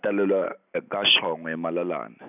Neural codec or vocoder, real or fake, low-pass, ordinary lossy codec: none; real; 3.6 kHz; none